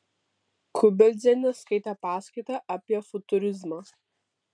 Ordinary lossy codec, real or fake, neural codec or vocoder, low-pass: MP3, 96 kbps; real; none; 9.9 kHz